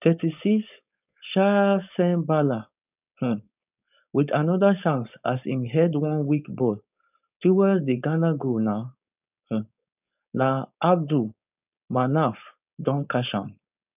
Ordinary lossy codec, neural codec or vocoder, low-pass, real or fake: none; codec, 16 kHz, 4.8 kbps, FACodec; 3.6 kHz; fake